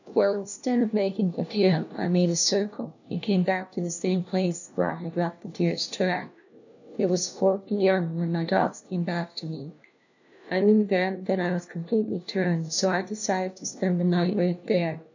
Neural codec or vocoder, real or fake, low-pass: codec, 16 kHz, 1 kbps, FunCodec, trained on LibriTTS, 50 frames a second; fake; 7.2 kHz